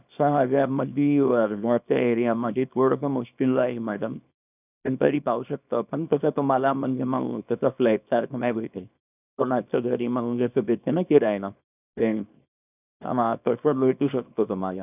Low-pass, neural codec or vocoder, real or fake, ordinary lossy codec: 3.6 kHz; codec, 24 kHz, 0.9 kbps, WavTokenizer, small release; fake; none